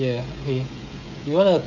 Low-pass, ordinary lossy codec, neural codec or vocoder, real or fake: 7.2 kHz; none; codec, 16 kHz, 16 kbps, FreqCodec, smaller model; fake